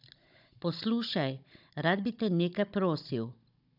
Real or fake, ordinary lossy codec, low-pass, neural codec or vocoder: fake; none; 5.4 kHz; codec, 16 kHz, 16 kbps, FunCodec, trained on Chinese and English, 50 frames a second